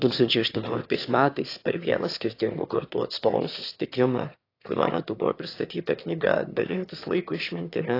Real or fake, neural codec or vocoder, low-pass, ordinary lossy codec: fake; autoencoder, 22.05 kHz, a latent of 192 numbers a frame, VITS, trained on one speaker; 5.4 kHz; AAC, 32 kbps